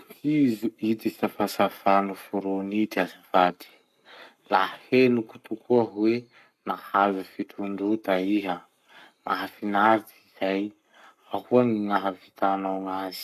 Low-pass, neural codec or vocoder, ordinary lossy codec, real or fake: 14.4 kHz; codec, 44.1 kHz, 7.8 kbps, Pupu-Codec; none; fake